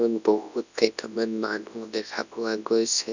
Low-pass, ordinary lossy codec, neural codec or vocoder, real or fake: 7.2 kHz; none; codec, 24 kHz, 0.9 kbps, WavTokenizer, large speech release; fake